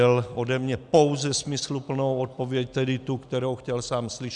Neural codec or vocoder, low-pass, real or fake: none; 10.8 kHz; real